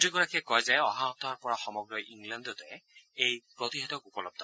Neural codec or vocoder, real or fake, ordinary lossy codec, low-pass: none; real; none; none